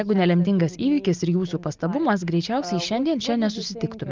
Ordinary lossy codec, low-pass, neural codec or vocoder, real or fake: Opus, 24 kbps; 7.2 kHz; none; real